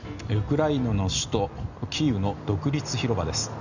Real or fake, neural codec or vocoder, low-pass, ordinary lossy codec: real; none; 7.2 kHz; none